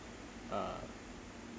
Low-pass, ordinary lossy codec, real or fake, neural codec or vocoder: none; none; real; none